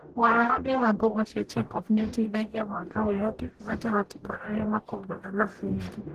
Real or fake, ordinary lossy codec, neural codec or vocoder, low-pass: fake; Opus, 16 kbps; codec, 44.1 kHz, 0.9 kbps, DAC; 14.4 kHz